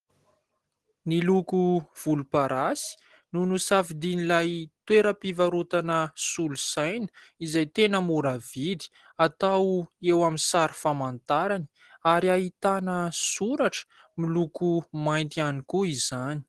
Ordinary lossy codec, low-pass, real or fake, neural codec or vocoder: Opus, 16 kbps; 10.8 kHz; real; none